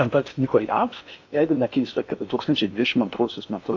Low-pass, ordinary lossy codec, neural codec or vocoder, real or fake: 7.2 kHz; AAC, 48 kbps; codec, 16 kHz in and 24 kHz out, 0.8 kbps, FocalCodec, streaming, 65536 codes; fake